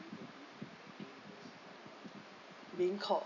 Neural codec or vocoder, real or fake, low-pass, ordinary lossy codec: none; real; 7.2 kHz; none